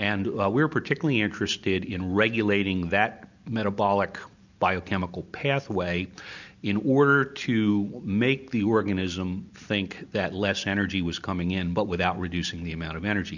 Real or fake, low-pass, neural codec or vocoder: real; 7.2 kHz; none